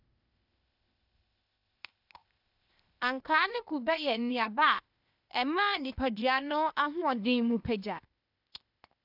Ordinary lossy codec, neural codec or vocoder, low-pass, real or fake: none; codec, 16 kHz, 0.8 kbps, ZipCodec; 5.4 kHz; fake